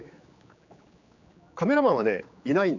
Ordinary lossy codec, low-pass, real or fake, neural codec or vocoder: none; 7.2 kHz; fake; codec, 16 kHz, 4 kbps, X-Codec, HuBERT features, trained on general audio